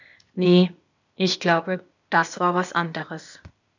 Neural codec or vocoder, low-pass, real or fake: codec, 16 kHz, 0.8 kbps, ZipCodec; 7.2 kHz; fake